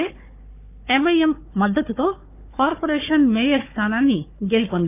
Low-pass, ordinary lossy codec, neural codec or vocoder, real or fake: 3.6 kHz; none; codec, 16 kHz, 4 kbps, FunCodec, trained on Chinese and English, 50 frames a second; fake